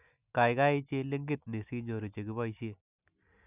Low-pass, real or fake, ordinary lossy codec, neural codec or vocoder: 3.6 kHz; real; none; none